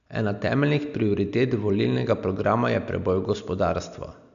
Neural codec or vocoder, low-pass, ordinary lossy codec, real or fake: none; 7.2 kHz; none; real